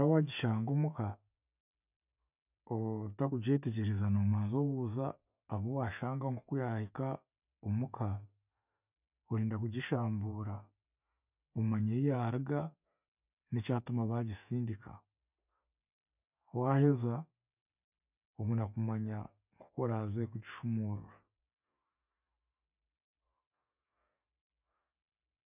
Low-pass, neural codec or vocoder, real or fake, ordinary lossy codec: 3.6 kHz; none; real; none